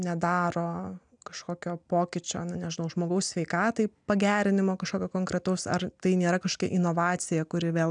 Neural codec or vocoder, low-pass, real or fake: none; 9.9 kHz; real